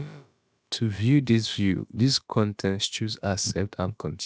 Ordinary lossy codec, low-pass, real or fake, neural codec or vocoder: none; none; fake; codec, 16 kHz, about 1 kbps, DyCAST, with the encoder's durations